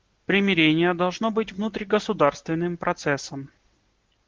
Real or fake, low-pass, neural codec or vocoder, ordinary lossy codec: real; 7.2 kHz; none; Opus, 16 kbps